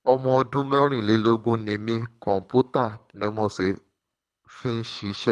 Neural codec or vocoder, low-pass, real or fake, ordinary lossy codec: codec, 24 kHz, 3 kbps, HILCodec; none; fake; none